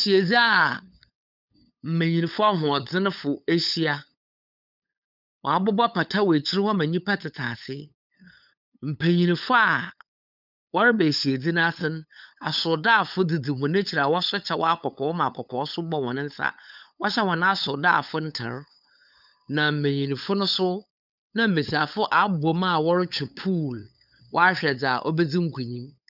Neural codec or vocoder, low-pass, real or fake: codec, 16 kHz, 8 kbps, FunCodec, trained on LibriTTS, 25 frames a second; 5.4 kHz; fake